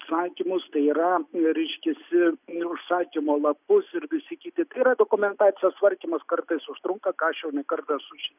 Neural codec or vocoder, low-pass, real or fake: none; 3.6 kHz; real